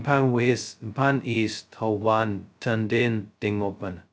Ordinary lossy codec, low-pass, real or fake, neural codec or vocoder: none; none; fake; codec, 16 kHz, 0.2 kbps, FocalCodec